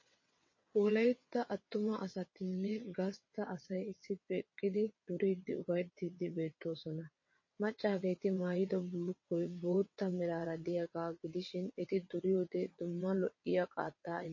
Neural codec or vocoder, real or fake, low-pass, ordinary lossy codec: vocoder, 22.05 kHz, 80 mel bands, WaveNeXt; fake; 7.2 kHz; MP3, 32 kbps